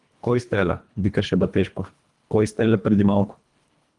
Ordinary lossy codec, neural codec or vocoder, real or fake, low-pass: Opus, 24 kbps; codec, 24 kHz, 1.5 kbps, HILCodec; fake; 10.8 kHz